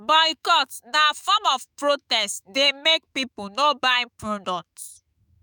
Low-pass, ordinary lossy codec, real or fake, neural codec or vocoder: none; none; fake; autoencoder, 48 kHz, 128 numbers a frame, DAC-VAE, trained on Japanese speech